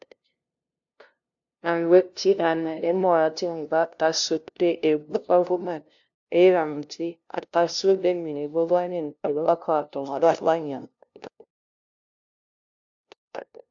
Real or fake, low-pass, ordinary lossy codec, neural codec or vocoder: fake; 7.2 kHz; AAC, 64 kbps; codec, 16 kHz, 0.5 kbps, FunCodec, trained on LibriTTS, 25 frames a second